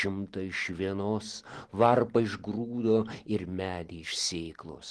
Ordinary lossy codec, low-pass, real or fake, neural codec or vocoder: Opus, 16 kbps; 10.8 kHz; real; none